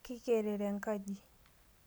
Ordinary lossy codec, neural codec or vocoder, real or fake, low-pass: none; none; real; none